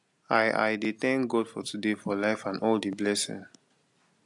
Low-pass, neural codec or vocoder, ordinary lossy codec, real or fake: 10.8 kHz; none; AAC, 64 kbps; real